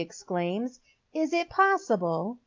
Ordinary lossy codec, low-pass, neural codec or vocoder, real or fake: Opus, 24 kbps; 7.2 kHz; none; real